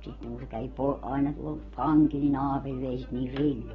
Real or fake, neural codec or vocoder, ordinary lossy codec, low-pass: real; none; AAC, 24 kbps; 7.2 kHz